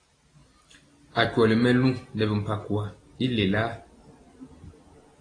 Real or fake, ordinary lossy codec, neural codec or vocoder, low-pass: real; AAC, 32 kbps; none; 9.9 kHz